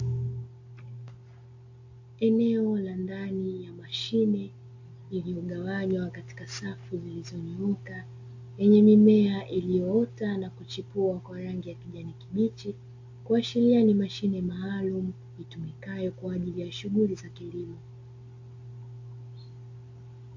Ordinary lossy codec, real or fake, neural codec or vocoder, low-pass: AAC, 48 kbps; real; none; 7.2 kHz